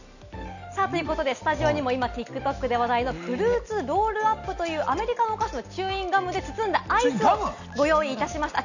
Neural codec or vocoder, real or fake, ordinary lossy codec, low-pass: none; real; none; 7.2 kHz